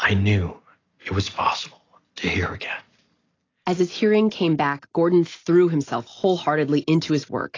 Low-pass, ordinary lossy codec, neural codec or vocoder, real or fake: 7.2 kHz; AAC, 32 kbps; none; real